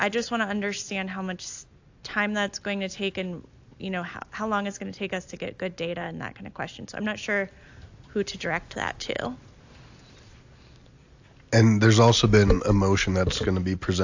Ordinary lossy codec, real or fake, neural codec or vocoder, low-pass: AAC, 48 kbps; real; none; 7.2 kHz